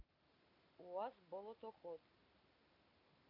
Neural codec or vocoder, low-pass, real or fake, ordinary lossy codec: none; 5.4 kHz; real; none